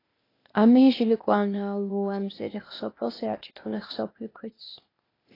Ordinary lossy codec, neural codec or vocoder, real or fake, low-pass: AAC, 24 kbps; codec, 16 kHz, 0.8 kbps, ZipCodec; fake; 5.4 kHz